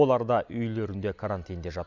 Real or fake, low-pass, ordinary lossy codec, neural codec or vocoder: real; 7.2 kHz; none; none